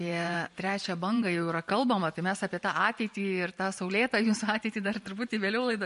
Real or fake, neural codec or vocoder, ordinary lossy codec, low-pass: fake; vocoder, 44.1 kHz, 128 mel bands every 512 samples, BigVGAN v2; MP3, 48 kbps; 14.4 kHz